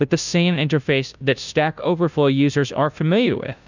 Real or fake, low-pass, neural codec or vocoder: fake; 7.2 kHz; codec, 16 kHz, 0.5 kbps, FunCodec, trained on Chinese and English, 25 frames a second